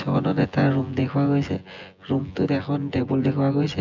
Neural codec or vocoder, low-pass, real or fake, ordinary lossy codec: vocoder, 24 kHz, 100 mel bands, Vocos; 7.2 kHz; fake; MP3, 64 kbps